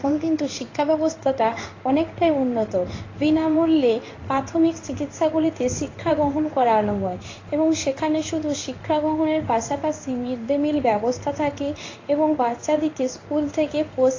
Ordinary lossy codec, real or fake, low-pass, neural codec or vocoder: AAC, 32 kbps; fake; 7.2 kHz; codec, 16 kHz in and 24 kHz out, 1 kbps, XY-Tokenizer